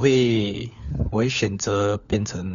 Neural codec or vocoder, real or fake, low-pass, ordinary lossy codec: codec, 16 kHz, 4 kbps, FunCodec, trained on LibriTTS, 50 frames a second; fake; 7.2 kHz; none